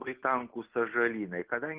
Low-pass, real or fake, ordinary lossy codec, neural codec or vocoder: 3.6 kHz; real; Opus, 16 kbps; none